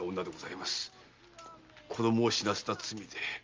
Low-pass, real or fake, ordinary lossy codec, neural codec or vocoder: 7.2 kHz; real; Opus, 24 kbps; none